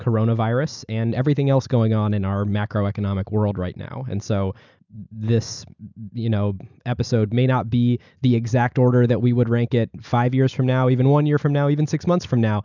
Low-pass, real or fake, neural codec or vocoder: 7.2 kHz; real; none